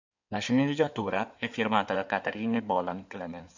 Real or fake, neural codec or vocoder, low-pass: fake; codec, 16 kHz in and 24 kHz out, 2.2 kbps, FireRedTTS-2 codec; 7.2 kHz